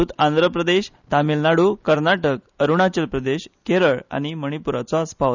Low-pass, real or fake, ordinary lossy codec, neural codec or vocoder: 7.2 kHz; real; none; none